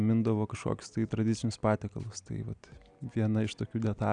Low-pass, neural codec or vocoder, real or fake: 9.9 kHz; none; real